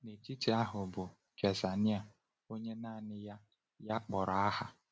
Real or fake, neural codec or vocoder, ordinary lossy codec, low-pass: real; none; none; none